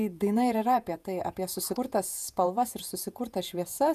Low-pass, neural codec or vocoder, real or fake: 14.4 kHz; vocoder, 48 kHz, 128 mel bands, Vocos; fake